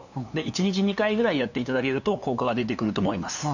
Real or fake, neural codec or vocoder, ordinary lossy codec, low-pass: fake; codec, 16 kHz, 2 kbps, FunCodec, trained on LibriTTS, 25 frames a second; none; 7.2 kHz